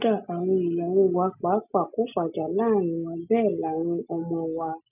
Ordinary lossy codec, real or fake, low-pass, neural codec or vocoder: none; real; 3.6 kHz; none